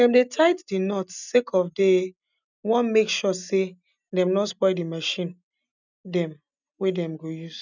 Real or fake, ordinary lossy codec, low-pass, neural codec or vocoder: real; none; 7.2 kHz; none